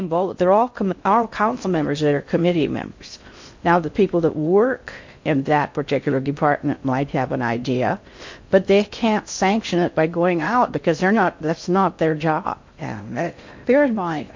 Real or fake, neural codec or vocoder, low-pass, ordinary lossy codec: fake; codec, 16 kHz in and 24 kHz out, 0.6 kbps, FocalCodec, streaming, 4096 codes; 7.2 kHz; MP3, 48 kbps